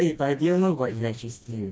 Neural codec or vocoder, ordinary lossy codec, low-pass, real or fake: codec, 16 kHz, 1 kbps, FreqCodec, smaller model; none; none; fake